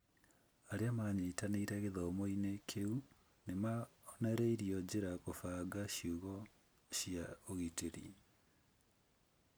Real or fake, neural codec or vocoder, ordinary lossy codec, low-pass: real; none; none; none